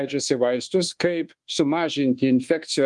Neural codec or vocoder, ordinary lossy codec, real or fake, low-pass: codec, 24 kHz, 1.2 kbps, DualCodec; Opus, 24 kbps; fake; 10.8 kHz